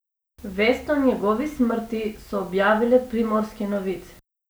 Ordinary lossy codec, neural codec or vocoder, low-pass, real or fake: none; none; none; real